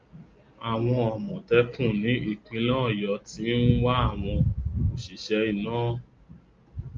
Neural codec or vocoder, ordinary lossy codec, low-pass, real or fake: none; Opus, 32 kbps; 7.2 kHz; real